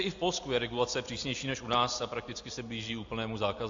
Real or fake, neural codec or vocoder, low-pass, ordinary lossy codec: real; none; 7.2 kHz; AAC, 64 kbps